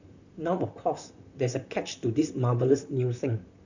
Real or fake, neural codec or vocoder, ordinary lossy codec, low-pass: fake; vocoder, 44.1 kHz, 128 mel bands, Pupu-Vocoder; none; 7.2 kHz